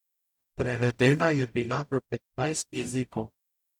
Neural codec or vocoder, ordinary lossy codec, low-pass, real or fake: codec, 44.1 kHz, 0.9 kbps, DAC; none; 19.8 kHz; fake